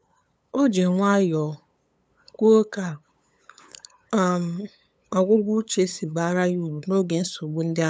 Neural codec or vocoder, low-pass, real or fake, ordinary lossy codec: codec, 16 kHz, 8 kbps, FunCodec, trained on LibriTTS, 25 frames a second; none; fake; none